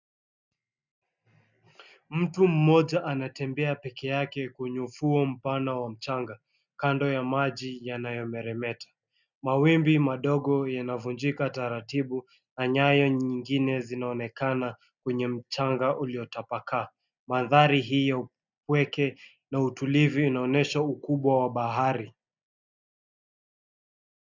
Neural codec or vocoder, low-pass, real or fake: none; 7.2 kHz; real